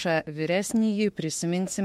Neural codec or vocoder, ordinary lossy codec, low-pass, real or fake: autoencoder, 48 kHz, 32 numbers a frame, DAC-VAE, trained on Japanese speech; MP3, 64 kbps; 19.8 kHz; fake